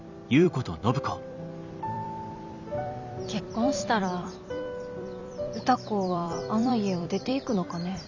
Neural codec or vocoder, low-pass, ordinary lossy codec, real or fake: vocoder, 44.1 kHz, 128 mel bands every 256 samples, BigVGAN v2; 7.2 kHz; none; fake